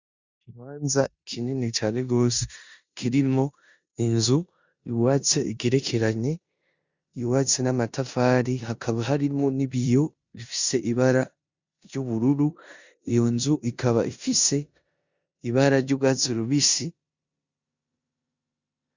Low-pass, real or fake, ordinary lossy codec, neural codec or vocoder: 7.2 kHz; fake; Opus, 64 kbps; codec, 16 kHz in and 24 kHz out, 0.9 kbps, LongCat-Audio-Codec, four codebook decoder